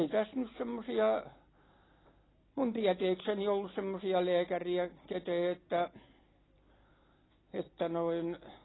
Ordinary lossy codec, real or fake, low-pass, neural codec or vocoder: AAC, 16 kbps; real; 7.2 kHz; none